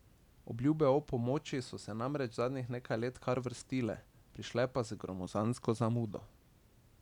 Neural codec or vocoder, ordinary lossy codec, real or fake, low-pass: none; none; real; 19.8 kHz